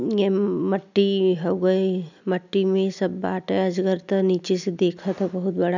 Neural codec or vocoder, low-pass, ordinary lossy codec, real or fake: none; 7.2 kHz; none; real